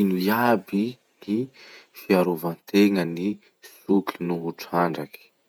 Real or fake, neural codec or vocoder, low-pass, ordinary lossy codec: fake; vocoder, 48 kHz, 128 mel bands, Vocos; 19.8 kHz; none